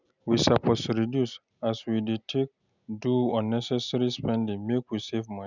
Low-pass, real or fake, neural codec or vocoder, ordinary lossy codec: 7.2 kHz; real; none; none